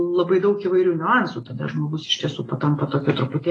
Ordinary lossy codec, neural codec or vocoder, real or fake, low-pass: AAC, 32 kbps; none; real; 10.8 kHz